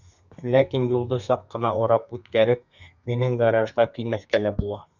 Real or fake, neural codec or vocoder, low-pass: fake; codec, 32 kHz, 1.9 kbps, SNAC; 7.2 kHz